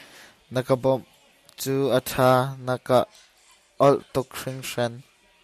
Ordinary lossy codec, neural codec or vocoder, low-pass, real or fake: MP3, 96 kbps; none; 14.4 kHz; real